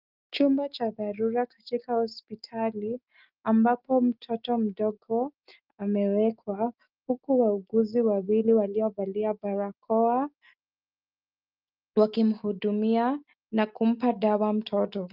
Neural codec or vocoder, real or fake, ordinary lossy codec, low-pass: none; real; Opus, 24 kbps; 5.4 kHz